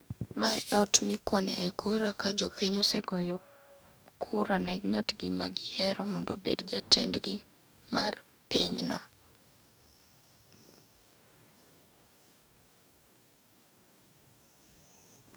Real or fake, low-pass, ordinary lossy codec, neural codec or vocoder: fake; none; none; codec, 44.1 kHz, 2.6 kbps, DAC